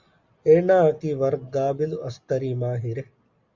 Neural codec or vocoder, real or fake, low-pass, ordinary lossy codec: none; real; 7.2 kHz; Opus, 64 kbps